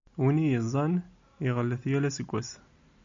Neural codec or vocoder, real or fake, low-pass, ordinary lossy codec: none; real; 7.2 kHz; AAC, 64 kbps